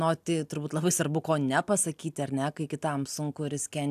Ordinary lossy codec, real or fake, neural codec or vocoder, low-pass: AAC, 96 kbps; real; none; 14.4 kHz